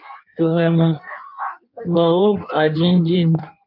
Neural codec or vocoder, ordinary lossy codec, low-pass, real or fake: codec, 16 kHz in and 24 kHz out, 1.1 kbps, FireRedTTS-2 codec; MP3, 48 kbps; 5.4 kHz; fake